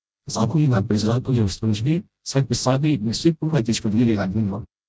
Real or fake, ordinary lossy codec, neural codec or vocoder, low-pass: fake; none; codec, 16 kHz, 0.5 kbps, FreqCodec, smaller model; none